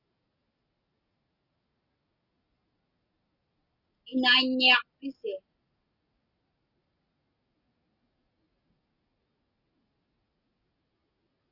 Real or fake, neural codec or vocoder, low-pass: real; none; 5.4 kHz